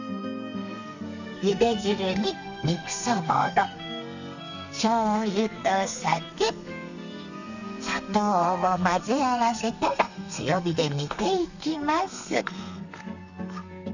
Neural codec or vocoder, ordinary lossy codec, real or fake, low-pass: codec, 32 kHz, 1.9 kbps, SNAC; none; fake; 7.2 kHz